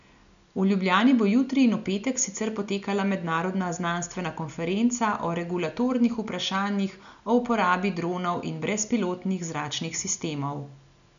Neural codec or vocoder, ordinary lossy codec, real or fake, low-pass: none; none; real; 7.2 kHz